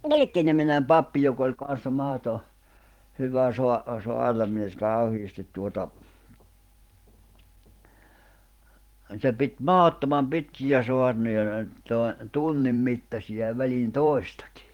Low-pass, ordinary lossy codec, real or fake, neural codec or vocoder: 19.8 kHz; Opus, 24 kbps; fake; vocoder, 44.1 kHz, 128 mel bands every 512 samples, BigVGAN v2